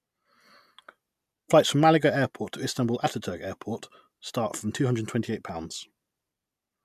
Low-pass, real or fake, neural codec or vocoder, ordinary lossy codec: 14.4 kHz; real; none; MP3, 96 kbps